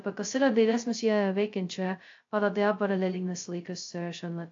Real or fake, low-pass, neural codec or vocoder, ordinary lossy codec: fake; 7.2 kHz; codec, 16 kHz, 0.2 kbps, FocalCodec; AAC, 48 kbps